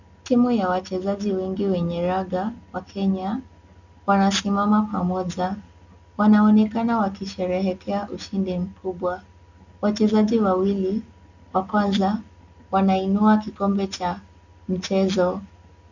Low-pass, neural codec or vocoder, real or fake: 7.2 kHz; none; real